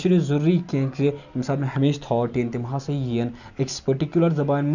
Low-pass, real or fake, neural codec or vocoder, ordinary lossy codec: 7.2 kHz; real; none; none